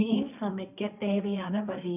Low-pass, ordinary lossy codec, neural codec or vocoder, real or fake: 3.6 kHz; none; codec, 16 kHz, 1.1 kbps, Voila-Tokenizer; fake